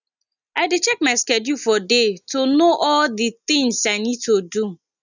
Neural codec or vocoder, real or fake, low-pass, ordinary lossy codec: none; real; 7.2 kHz; none